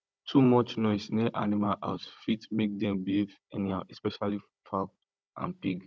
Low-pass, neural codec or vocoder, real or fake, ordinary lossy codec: none; codec, 16 kHz, 16 kbps, FunCodec, trained on Chinese and English, 50 frames a second; fake; none